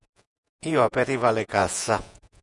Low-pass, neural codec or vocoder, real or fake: 10.8 kHz; vocoder, 48 kHz, 128 mel bands, Vocos; fake